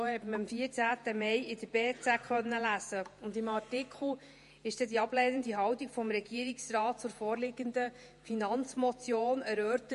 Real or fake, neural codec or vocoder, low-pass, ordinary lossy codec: fake; vocoder, 48 kHz, 128 mel bands, Vocos; 14.4 kHz; MP3, 48 kbps